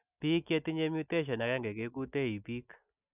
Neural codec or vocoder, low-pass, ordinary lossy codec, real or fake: none; 3.6 kHz; none; real